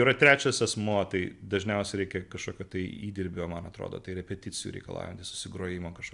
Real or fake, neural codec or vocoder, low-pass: real; none; 10.8 kHz